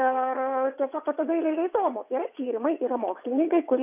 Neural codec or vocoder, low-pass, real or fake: vocoder, 22.05 kHz, 80 mel bands, WaveNeXt; 3.6 kHz; fake